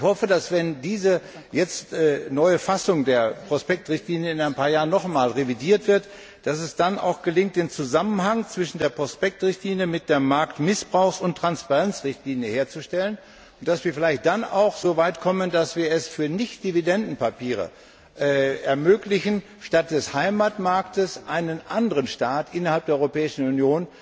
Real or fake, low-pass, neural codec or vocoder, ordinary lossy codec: real; none; none; none